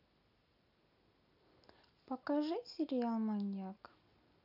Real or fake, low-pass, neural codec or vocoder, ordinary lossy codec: real; 5.4 kHz; none; none